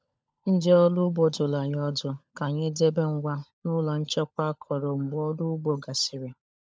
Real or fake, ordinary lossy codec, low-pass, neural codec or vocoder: fake; none; none; codec, 16 kHz, 16 kbps, FunCodec, trained on LibriTTS, 50 frames a second